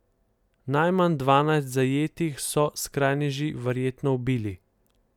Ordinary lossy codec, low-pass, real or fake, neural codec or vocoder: none; 19.8 kHz; real; none